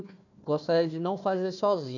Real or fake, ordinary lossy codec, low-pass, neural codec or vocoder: fake; none; 7.2 kHz; codec, 16 kHz, 1 kbps, FunCodec, trained on Chinese and English, 50 frames a second